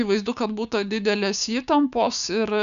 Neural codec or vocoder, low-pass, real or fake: codec, 16 kHz, 6 kbps, DAC; 7.2 kHz; fake